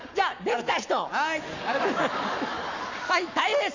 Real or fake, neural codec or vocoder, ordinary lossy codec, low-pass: fake; codec, 44.1 kHz, 7.8 kbps, Pupu-Codec; none; 7.2 kHz